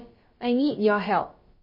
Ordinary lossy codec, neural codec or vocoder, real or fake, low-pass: MP3, 24 kbps; codec, 16 kHz, about 1 kbps, DyCAST, with the encoder's durations; fake; 5.4 kHz